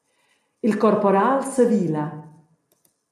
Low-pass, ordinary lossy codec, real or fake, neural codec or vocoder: 14.4 kHz; AAC, 96 kbps; real; none